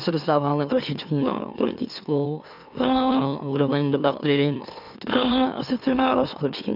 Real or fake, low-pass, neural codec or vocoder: fake; 5.4 kHz; autoencoder, 44.1 kHz, a latent of 192 numbers a frame, MeloTTS